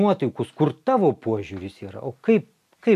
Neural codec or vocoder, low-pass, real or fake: none; 14.4 kHz; real